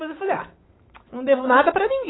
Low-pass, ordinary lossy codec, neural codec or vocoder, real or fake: 7.2 kHz; AAC, 16 kbps; autoencoder, 48 kHz, 128 numbers a frame, DAC-VAE, trained on Japanese speech; fake